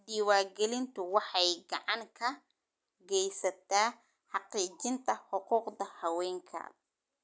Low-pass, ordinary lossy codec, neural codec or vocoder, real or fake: none; none; none; real